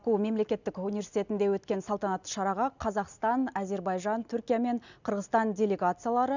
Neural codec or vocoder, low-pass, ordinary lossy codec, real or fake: none; 7.2 kHz; none; real